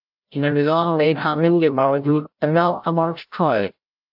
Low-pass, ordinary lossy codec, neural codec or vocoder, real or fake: 5.4 kHz; AAC, 48 kbps; codec, 16 kHz, 0.5 kbps, FreqCodec, larger model; fake